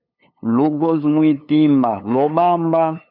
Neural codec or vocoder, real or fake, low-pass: codec, 16 kHz, 2 kbps, FunCodec, trained on LibriTTS, 25 frames a second; fake; 5.4 kHz